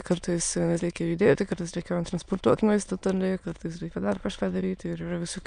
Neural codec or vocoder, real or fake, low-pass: autoencoder, 22.05 kHz, a latent of 192 numbers a frame, VITS, trained on many speakers; fake; 9.9 kHz